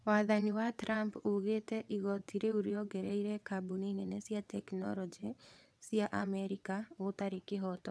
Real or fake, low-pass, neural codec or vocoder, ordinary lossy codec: fake; none; vocoder, 22.05 kHz, 80 mel bands, WaveNeXt; none